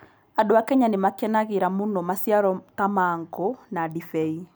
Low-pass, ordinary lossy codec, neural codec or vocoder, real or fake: none; none; none; real